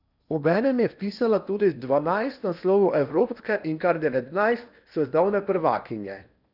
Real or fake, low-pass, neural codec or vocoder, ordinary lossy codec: fake; 5.4 kHz; codec, 16 kHz in and 24 kHz out, 0.8 kbps, FocalCodec, streaming, 65536 codes; none